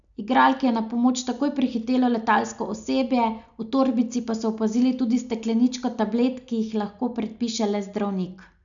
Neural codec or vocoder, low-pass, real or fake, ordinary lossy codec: none; 7.2 kHz; real; none